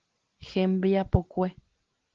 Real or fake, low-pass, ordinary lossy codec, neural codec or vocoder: real; 7.2 kHz; Opus, 16 kbps; none